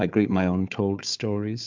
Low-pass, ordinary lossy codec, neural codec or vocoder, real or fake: 7.2 kHz; MP3, 64 kbps; codec, 16 kHz, 4 kbps, FunCodec, trained on Chinese and English, 50 frames a second; fake